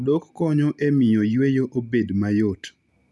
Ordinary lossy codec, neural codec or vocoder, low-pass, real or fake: none; none; none; real